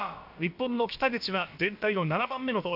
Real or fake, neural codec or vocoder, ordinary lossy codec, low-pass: fake; codec, 16 kHz, about 1 kbps, DyCAST, with the encoder's durations; none; 5.4 kHz